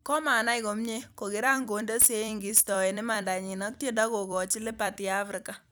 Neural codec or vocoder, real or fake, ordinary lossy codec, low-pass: vocoder, 44.1 kHz, 128 mel bands every 256 samples, BigVGAN v2; fake; none; none